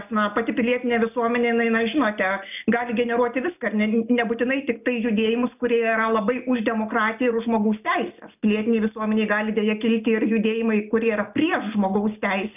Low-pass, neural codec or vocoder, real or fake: 3.6 kHz; none; real